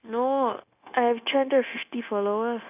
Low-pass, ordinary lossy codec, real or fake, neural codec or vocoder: 3.6 kHz; none; fake; codec, 16 kHz, 0.9 kbps, LongCat-Audio-Codec